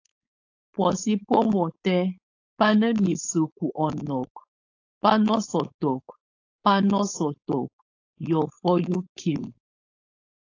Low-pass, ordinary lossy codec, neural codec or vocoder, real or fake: 7.2 kHz; AAC, 48 kbps; codec, 16 kHz, 4.8 kbps, FACodec; fake